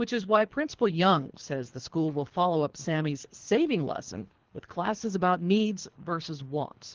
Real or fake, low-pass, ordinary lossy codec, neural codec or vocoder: fake; 7.2 kHz; Opus, 16 kbps; codec, 24 kHz, 3 kbps, HILCodec